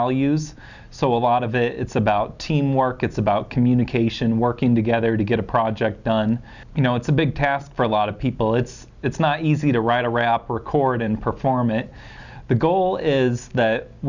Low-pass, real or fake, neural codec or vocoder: 7.2 kHz; real; none